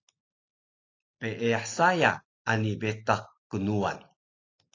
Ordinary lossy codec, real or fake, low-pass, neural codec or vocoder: AAC, 32 kbps; real; 7.2 kHz; none